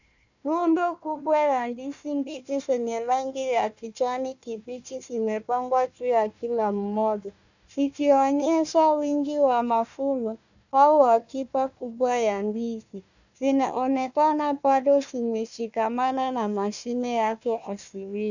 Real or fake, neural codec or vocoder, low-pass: fake; codec, 16 kHz, 1 kbps, FunCodec, trained on Chinese and English, 50 frames a second; 7.2 kHz